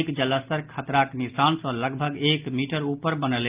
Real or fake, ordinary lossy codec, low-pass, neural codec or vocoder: real; Opus, 32 kbps; 3.6 kHz; none